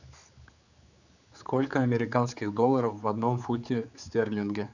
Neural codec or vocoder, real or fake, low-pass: codec, 16 kHz, 4 kbps, X-Codec, HuBERT features, trained on general audio; fake; 7.2 kHz